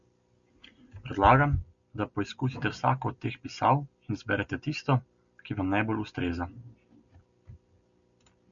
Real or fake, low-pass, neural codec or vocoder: real; 7.2 kHz; none